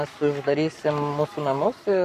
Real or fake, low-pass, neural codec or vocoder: fake; 14.4 kHz; codec, 44.1 kHz, 7.8 kbps, Pupu-Codec